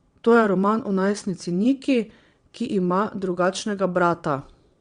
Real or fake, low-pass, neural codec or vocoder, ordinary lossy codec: fake; 9.9 kHz; vocoder, 22.05 kHz, 80 mel bands, WaveNeXt; Opus, 64 kbps